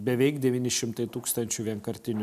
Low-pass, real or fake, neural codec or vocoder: 14.4 kHz; real; none